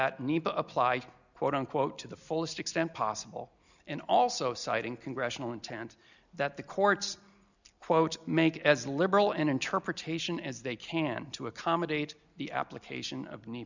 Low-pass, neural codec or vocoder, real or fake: 7.2 kHz; none; real